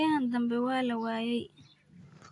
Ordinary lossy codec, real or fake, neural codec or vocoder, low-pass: AAC, 48 kbps; real; none; 10.8 kHz